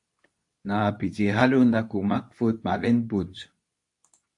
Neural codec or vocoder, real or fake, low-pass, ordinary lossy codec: codec, 24 kHz, 0.9 kbps, WavTokenizer, medium speech release version 2; fake; 10.8 kHz; AAC, 64 kbps